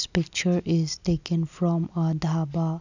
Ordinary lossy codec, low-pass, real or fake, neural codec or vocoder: none; 7.2 kHz; real; none